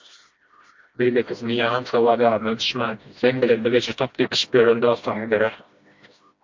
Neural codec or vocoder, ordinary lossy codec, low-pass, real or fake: codec, 16 kHz, 1 kbps, FreqCodec, smaller model; MP3, 48 kbps; 7.2 kHz; fake